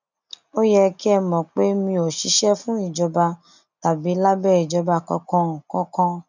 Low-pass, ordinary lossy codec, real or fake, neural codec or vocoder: 7.2 kHz; none; real; none